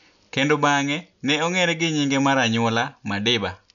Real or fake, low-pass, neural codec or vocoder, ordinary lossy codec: real; 7.2 kHz; none; none